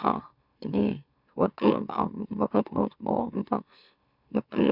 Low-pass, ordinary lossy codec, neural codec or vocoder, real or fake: 5.4 kHz; none; autoencoder, 44.1 kHz, a latent of 192 numbers a frame, MeloTTS; fake